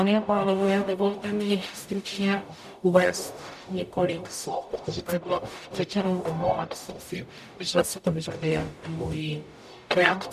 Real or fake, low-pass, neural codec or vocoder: fake; 14.4 kHz; codec, 44.1 kHz, 0.9 kbps, DAC